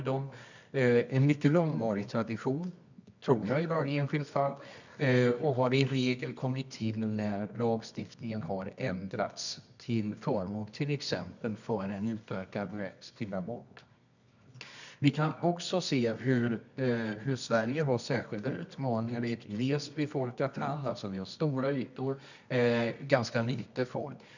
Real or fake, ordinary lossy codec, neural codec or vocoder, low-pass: fake; none; codec, 24 kHz, 0.9 kbps, WavTokenizer, medium music audio release; 7.2 kHz